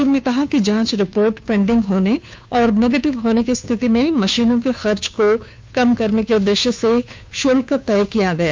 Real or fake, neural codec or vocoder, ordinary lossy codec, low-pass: fake; codec, 16 kHz, 2 kbps, FunCodec, trained on Chinese and English, 25 frames a second; none; none